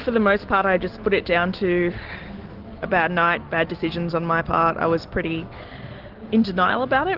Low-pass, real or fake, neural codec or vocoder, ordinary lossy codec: 5.4 kHz; real; none; Opus, 16 kbps